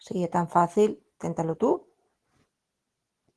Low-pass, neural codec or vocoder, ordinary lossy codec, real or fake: 10.8 kHz; none; Opus, 16 kbps; real